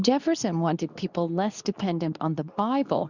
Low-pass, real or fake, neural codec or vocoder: 7.2 kHz; fake; codec, 24 kHz, 0.9 kbps, WavTokenizer, medium speech release version 2